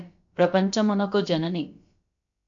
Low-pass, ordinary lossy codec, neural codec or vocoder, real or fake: 7.2 kHz; MP3, 48 kbps; codec, 16 kHz, about 1 kbps, DyCAST, with the encoder's durations; fake